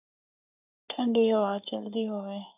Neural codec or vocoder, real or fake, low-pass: codec, 44.1 kHz, 7.8 kbps, Pupu-Codec; fake; 3.6 kHz